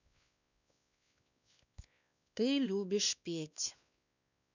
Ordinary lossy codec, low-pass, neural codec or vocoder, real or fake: none; 7.2 kHz; codec, 16 kHz, 2 kbps, X-Codec, WavLM features, trained on Multilingual LibriSpeech; fake